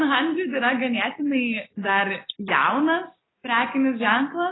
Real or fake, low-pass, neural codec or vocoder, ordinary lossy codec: real; 7.2 kHz; none; AAC, 16 kbps